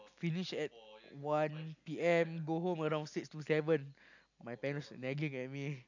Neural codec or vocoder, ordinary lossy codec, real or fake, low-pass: none; none; real; 7.2 kHz